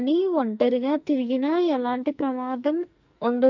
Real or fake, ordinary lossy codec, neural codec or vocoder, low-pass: fake; none; codec, 44.1 kHz, 2.6 kbps, SNAC; 7.2 kHz